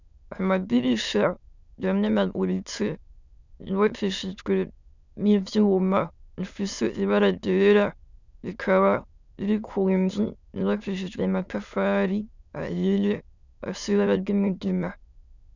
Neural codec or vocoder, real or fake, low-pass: autoencoder, 22.05 kHz, a latent of 192 numbers a frame, VITS, trained on many speakers; fake; 7.2 kHz